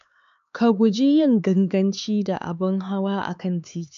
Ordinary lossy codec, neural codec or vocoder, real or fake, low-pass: none; codec, 16 kHz, 4 kbps, X-Codec, HuBERT features, trained on LibriSpeech; fake; 7.2 kHz